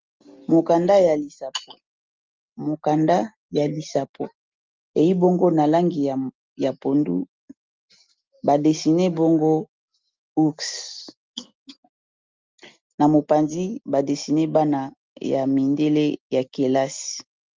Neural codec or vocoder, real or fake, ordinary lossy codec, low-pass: none; real; Opus, 32 kbps; 7.2 kHz